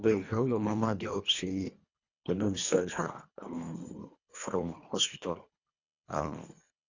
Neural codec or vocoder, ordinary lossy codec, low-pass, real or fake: codec, 24 kHz, 1.5 kbps, HILCodec; Opus, 64 kbps; 7.2 kHz; fake